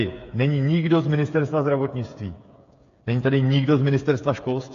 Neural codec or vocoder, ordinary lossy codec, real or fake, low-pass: codec, 16 kHz, 8 kbps, FreqCodec, smaller model; AAC, 48 kbps; fake; 7.2 kHz